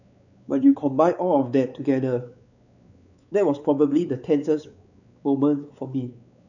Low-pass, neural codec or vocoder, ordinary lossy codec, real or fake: 7.2 kHz; codec, 16 kHz, 4 kbps, X-Codec, WavLM features, trained on Multilingual LibriSpeech; none; fake